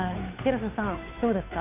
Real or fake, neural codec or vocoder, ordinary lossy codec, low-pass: fake; codec, 16 kHz, 2 kbps, FunCodec, trained on Chinese and English, 25 frames a second; none; 3.6 kHz